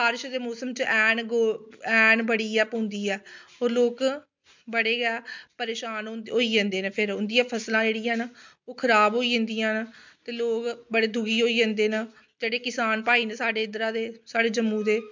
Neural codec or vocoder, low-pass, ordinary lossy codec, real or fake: none; 7.2 kHz; MP3, 64 kbps; real